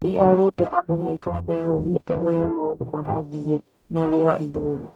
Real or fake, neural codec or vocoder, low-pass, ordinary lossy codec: fake; codec, 44.1 kHz, 0.9 kbps, DAC; 19.8 kHz; none